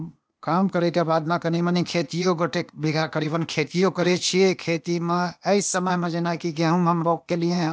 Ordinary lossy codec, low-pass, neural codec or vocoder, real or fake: none; none; codec, 16 kHz, 0.8 kbps, ZipCodec; fake